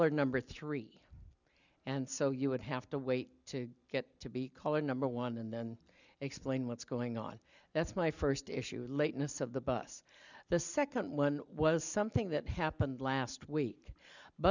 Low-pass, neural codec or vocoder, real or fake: 7.2 kHz; none; real